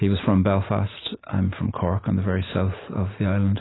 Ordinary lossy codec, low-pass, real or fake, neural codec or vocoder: AAC, 16 kbps; 7.2 kHz; real; none